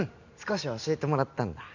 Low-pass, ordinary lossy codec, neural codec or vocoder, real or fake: 7.2 kHz; none; none; real